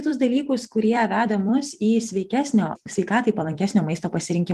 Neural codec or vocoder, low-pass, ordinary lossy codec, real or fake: vocoder, 44.1 kHz, 128 mel bands every 256 samples, BigVGAN v2; 14.4 kHz; Opus, 24 kbps; fake